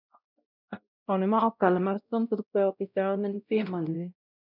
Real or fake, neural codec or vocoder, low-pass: fake; codec, 16 kHz, 0.5 kbps, X-Codec, WavLM features, trained on Multilingual LibriSpeech; 5.4 kHz